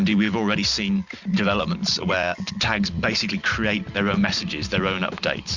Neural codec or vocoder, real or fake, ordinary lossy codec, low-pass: none; real; Opus, 64 kbps; 7.2 kHz